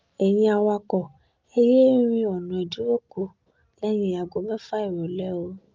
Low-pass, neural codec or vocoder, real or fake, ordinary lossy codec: 7.2 kHz; none; real; Opus, 24 kbps